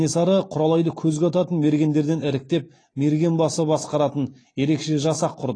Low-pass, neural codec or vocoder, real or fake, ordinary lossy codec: 9.9 kHz; none; real; AAC, 32 kbps